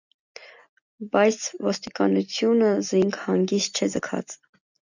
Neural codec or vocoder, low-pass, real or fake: none; 7.2 kHz; real